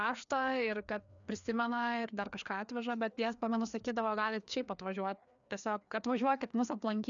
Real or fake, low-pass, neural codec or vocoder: fake; 7.2 kHz; codec, 16 kHz, 2 kbps, FreqCodec, larger model